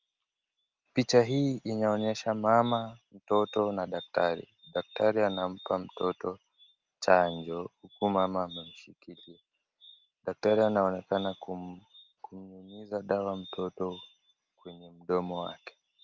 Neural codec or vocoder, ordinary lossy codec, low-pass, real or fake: none; Opus, 24 kbps; 7.2 kHz; real